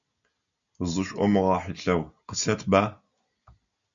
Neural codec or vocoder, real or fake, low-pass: none; real; 7.2 kHz